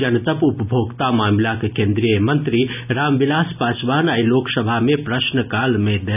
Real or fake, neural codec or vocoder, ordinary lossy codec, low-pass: real; none; none; 3.6 kHz